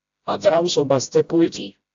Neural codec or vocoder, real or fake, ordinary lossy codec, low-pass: codec, 16 kHz, 0.5 kbps, FreqCodec, smaller model; fake; AAC, 64 kbps; 7.2 kHz